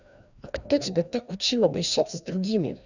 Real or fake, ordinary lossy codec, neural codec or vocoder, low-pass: fake; none; codec, 16 kHz, 1 kbps, FreqCodec, larger model; 7.2 kHz